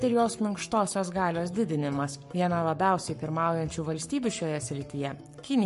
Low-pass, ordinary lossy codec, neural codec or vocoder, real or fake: 14.4 kHz; MP3, 48 kbps; codec, 44.1 kHz, 7.8 kbps, Pupu-Codec; fake